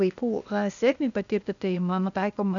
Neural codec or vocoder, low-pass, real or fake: codec, 16 kHz, 0.8 kbps, ZipCodec; 7.2 kHz; fake